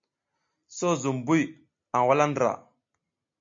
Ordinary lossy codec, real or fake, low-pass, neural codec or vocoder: MP3, 48 kbps; real; 7.2 kHz; none